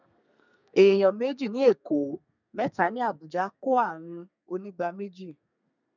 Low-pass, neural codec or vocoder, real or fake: 7.2 kHz; codec, 32 kHz, 1.9 kbps, SNAC; fake